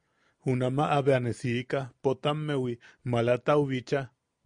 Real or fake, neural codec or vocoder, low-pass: real; none; 9.9 kHz